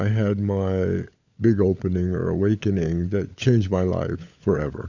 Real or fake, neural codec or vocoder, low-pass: fake; codec, 16 kHz, 16 kbps, FunCodec, trained on LibriTTS, 50 frames a second; 7.2 kHz